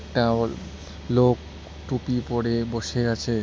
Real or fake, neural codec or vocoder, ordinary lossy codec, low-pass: real; none; none; none